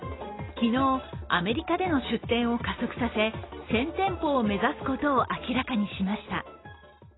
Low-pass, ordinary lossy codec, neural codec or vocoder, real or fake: 7.2 kHz; AAC, 16 kbps; none; real